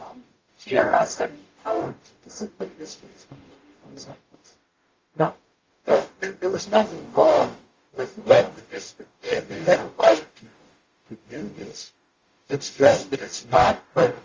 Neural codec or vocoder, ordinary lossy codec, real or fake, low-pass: codec, 44.1 kHz, 0.9 kbps, DAC; Opus, 32 kbps; fake; 7.2 kHz